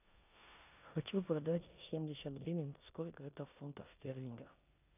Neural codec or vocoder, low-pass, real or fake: codec, 16 kHz in and 24 kHz out, 0.9 kbps, LongCat-Audio-Codec, four codebook decoder; 3.6 kHz; fake